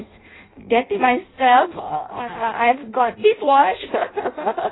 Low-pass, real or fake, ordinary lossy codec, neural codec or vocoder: 7.2 kHz; fake; AAC, 16 kbps; codec, 16 kHz in and 24 kHz out, 0.6 kbps, FireRedTTS-2 codec